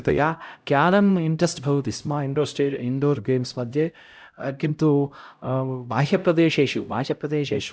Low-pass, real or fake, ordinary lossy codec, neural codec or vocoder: none; fake; none; codec, 16 kHz, 0.5 kbps, X-Codec, HuBERT features, trained on LibriSpeech